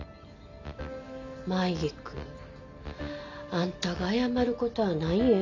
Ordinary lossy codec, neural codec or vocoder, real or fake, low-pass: MP3, 64 kbps; none; real; 7.2 kHz